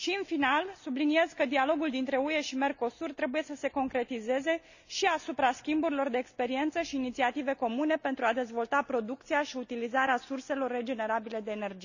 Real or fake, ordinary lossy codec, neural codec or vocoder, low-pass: real; none; none; 7.2 kHz